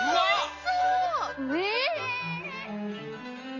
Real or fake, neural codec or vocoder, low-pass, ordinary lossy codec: real; none; 7.2 kHz; MP3, 32 kbps